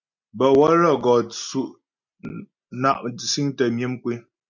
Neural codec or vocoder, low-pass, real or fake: none; 7.2 kHz; real